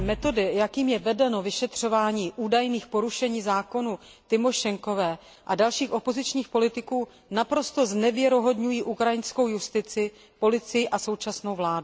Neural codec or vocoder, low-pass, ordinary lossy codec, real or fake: none; none; none; real